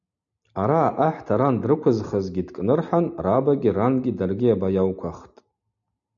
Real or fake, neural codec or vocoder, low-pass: real; none; 7.2 kHz